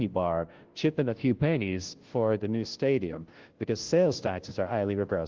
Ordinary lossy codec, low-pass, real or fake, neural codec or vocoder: Opus, 16 kbps; 7.2 kHz; fake; codec, 16 kHz, 0.5 kbps, FunCodec, trained on Chinese and English, 25 frames a second